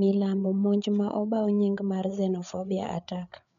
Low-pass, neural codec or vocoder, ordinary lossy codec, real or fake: 7.2 kHz; codec, 16 kHz, 8 kbps, FreqCodec, larger model; none; fake